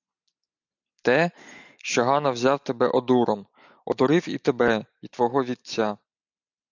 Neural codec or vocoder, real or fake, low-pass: none; real; 7.2 kHz